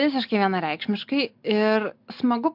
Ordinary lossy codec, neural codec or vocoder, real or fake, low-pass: MP3, 48 kbps; none; real; 5.4 kHz